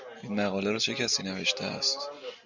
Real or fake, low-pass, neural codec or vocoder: real; 7.2 kHz; none